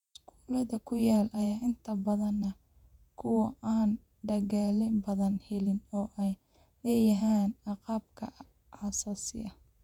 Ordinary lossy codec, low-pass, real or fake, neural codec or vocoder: none; 19.8 kHz; fake; vocoder, 48 kHz, 128 mel bands, Vocos